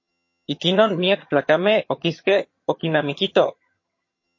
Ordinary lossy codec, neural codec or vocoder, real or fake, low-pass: MP3, 32 kbps; vocoder, 22.05 kHz, 80 mel bands, HiFi-GAN; fake; 7.2 kHz